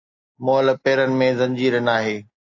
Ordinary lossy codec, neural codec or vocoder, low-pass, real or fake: AAC, 48 kbps; none; 7.2 kHz; real